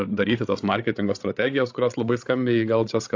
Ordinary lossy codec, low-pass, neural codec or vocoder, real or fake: AAC, 48 kbps; 7.2 kHz; codec, 16 kHz, 16 kbps, FreqCodec, larger model; fake